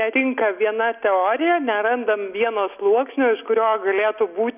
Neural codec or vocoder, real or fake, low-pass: none; real; 3.6 kHz